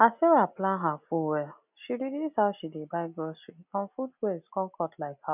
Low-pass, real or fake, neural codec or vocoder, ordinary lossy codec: 3.6 kHz; real; none; none